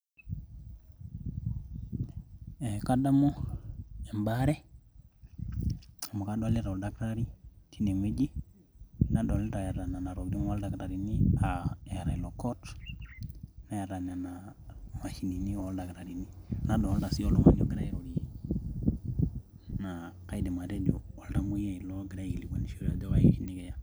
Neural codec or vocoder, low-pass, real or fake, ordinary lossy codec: none; none; real; none